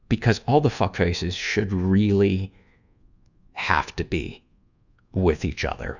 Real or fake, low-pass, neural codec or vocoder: fake; 7.2 kHz; codec, 24 kHz, 1.2 kbps, DualCodec